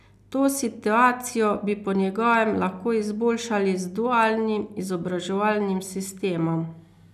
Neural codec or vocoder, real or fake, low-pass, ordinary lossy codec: none; real; 14.4 kHz; none